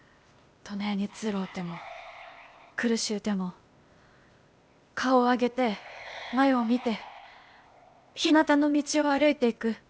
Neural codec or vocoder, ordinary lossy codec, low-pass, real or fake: codec, 16 kHz, 0.8 kbps, ZipCodec; none; none; fake